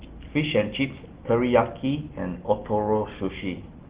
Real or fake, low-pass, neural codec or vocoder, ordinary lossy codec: real; 3.6 kHz; none; Opus, 16 kbps